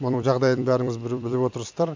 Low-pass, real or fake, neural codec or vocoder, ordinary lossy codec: 7.2 kHz; fake; vocoder, 44.1 kHz, 128 mel bands every 256 samples, BigVGAN v2; MP3, 64 kbps